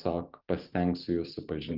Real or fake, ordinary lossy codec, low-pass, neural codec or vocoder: fake; Opus, 32 kbps; 5.4 kHz; vocoder, 44.1 kHz, 128 mel bands every 512 samples, BigVGAN v2